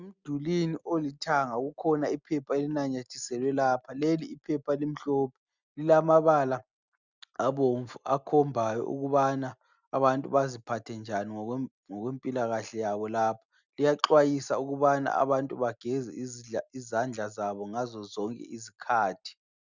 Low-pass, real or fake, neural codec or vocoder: 7.2 kHz; real; none